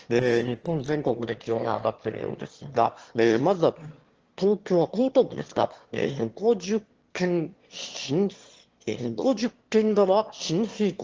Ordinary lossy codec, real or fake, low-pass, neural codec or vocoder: Opus, 16 kbps; fake; 7.2 kHz; autoencoder, 22.05 kHz, a latent of 192 numbers a frame, VITS, trained on one speaker